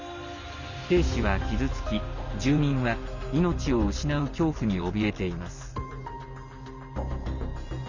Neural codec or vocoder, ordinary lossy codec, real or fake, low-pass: none; none; real; 7.2 kHz